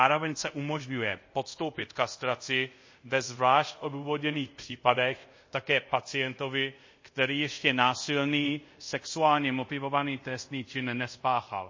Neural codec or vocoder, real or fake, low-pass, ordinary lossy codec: codec, 24 kHz, 0.5 kbps, DualCodec; fake; 7.2 kHz; MP3, 32 kbps